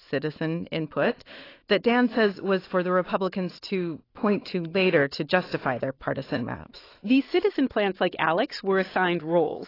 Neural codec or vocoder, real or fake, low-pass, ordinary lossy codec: autoencoder, 48 kHz, 128 numbers a frame, DAC-VAE, trained on Japanese speech; fake; 5.4 kHz; AAC, 24 kbps